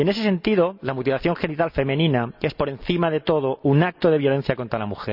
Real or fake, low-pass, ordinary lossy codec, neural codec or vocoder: real; 5.4 kHz; none; none